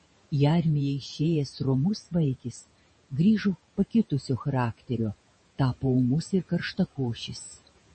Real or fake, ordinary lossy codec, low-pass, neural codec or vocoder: fake; MP3, 32 kbps; 9.9 kHz; vocoder, 48 kHz, 128 mel bands, Vocos